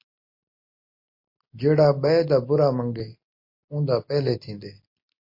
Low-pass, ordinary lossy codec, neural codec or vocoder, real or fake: 5.4 kHz; MP3, 32 kbps; none; real